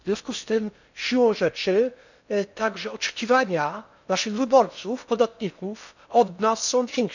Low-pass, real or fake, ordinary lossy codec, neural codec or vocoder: 7.2 kHz; fake; none; codec, 16 kHz in and 24 kHz out, 0.6 kbps, FocalCodec, streaming, 4096 codes